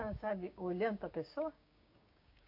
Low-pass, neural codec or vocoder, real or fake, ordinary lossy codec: 5.4 kHz; codec, 16 kHz, 6 kbps, DAC; fake; AAC, 48 kbps